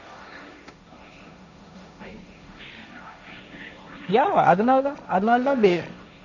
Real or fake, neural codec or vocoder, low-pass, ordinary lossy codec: fake; codec, 16 kHz, 1.1 kbps, Voila-Tokenizer; 7.2 kHz; none